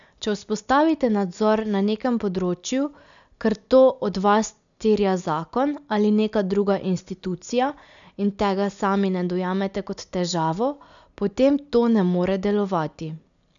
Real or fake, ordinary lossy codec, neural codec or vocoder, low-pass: real; none; none; 7.2 kHz